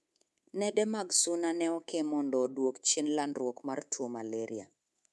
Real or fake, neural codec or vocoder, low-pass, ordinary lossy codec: fake; codec, 24 kHz, 3.1 kbps, DualCodec; none; none